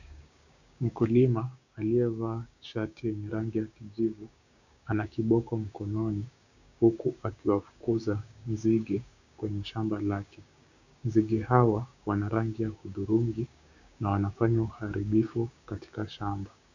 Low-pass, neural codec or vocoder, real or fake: 7.2 kHz; codec, 16 kHz, 6 kbps, DAC; fake